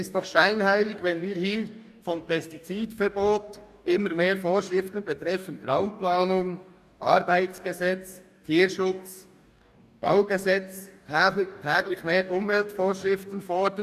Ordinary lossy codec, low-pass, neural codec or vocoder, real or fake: none; 14.4 kHz; codec, 44.1 kHz, 2.6 kbps, DAC; fake